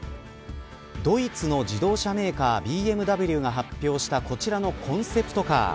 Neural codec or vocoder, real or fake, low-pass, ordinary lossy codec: none; real; none; none